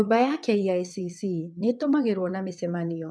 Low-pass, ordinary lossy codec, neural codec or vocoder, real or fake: none; none; vocoder, 22.05 kHz, 80 mel bands, WaveNeXt; fake